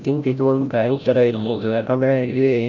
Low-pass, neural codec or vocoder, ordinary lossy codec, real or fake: 7.2 kHz; codec, 16 kHz, 0.5 kbps, FreqCodec, larger model; none; fake